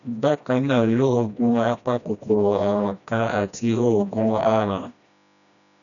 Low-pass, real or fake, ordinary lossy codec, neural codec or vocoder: 7.2 kHz; fake; none; codec, 16 kHz, 1 kbps, FreqCodec, smaller model